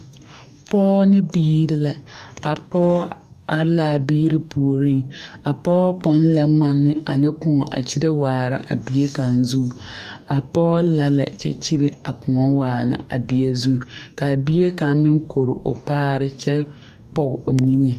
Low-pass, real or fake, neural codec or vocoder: 14.4 kHz; fake; codec, 44.1 kHz, 2.6 kbps, DAC